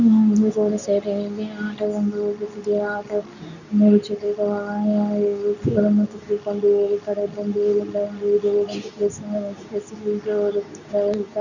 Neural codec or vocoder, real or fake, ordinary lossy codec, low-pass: codec, 24 kHz, 0.9 kbps, WavTokenizer, medium speech release version 2; fake; none; 7.2 kHz